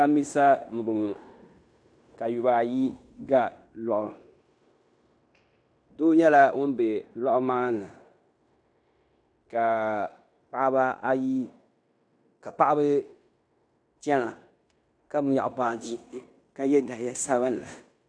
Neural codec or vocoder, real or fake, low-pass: codec, 16 kHz in and 24 kHz out, 0.9 kbps, LongCat-Audio-Codec, fine tuned four codebook decoder; fake; 9.9 kHz